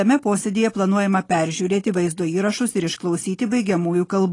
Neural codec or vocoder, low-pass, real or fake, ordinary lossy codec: none; 10.8 kHz; real; AAC, 32 kbps